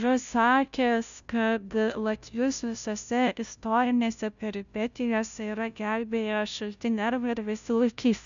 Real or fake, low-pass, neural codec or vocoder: fake; 7.2 kHz; codec, 16 kHz, 0.5 kbps, FunCodec, trained on Chinese and English, 25 frames a second